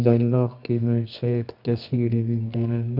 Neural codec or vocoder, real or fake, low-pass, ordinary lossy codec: codec, 24 kHz, 0.9 kbps, WavTokenizer, medium music audio release; fake; 5.4 kHz; none